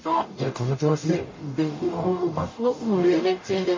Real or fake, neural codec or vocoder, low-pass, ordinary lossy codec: fake; codec, 44.1 kHz, 0.9 kbps, DAC; 7.2 kHz; MP3, 32 kbps